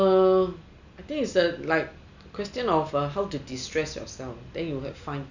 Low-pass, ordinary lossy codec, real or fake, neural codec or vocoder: 7.2 kHz; none; real; none